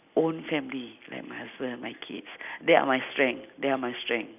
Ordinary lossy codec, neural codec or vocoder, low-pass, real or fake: none; none; 3.6 kHz; real